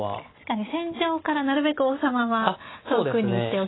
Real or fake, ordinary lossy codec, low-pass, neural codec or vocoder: real; AAC, 16 kbps; 7.2 kHz; none